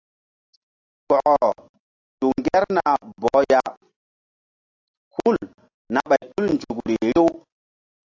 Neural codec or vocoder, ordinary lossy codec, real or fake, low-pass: none; AAC, 48 kbps; real; 7.2 kHz